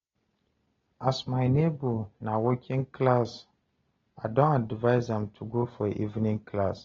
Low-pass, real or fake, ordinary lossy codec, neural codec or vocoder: 7.2 kHz; real; AAC, 24 kbps; none